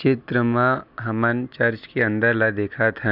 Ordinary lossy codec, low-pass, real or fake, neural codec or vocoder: none; 5.4 kHz; real; none